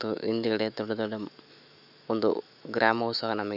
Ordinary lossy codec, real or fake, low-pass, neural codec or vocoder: none; fake; 5.4 kHz; autoencoder, 48 kHz, 128 numbers a frame, DAC-VAE, trained on Japanese speech